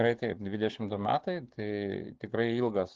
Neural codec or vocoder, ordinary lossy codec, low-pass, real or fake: codec, 16 kHz, 6 kbps, DAC; Opus, 16 kbps; 7.2 kHz; fake